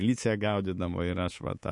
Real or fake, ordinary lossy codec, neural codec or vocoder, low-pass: fake; MP3, 48 kbps; codec, 24 kHz, 3.1 kbps, DualCodec; 10.8 kHz